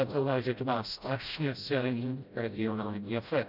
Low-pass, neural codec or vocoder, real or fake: 5.4 kHz; codec, 16 kHz, 0.5 kbps, FreqCodec, smaller model; fake